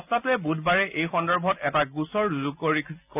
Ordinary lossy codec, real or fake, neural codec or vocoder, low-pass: none; real; none; 3.6 kHz